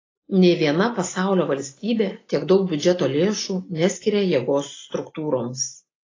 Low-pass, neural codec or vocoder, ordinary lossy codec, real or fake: 7.2 kHz; none; AAC, 32 kbps; real